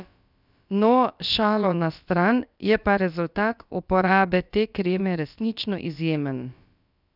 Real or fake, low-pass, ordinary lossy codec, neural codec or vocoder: fake; 5.4 kHz; none; codec, 16 kHz, about 1 kbps, DyCAST, with the encoder's durations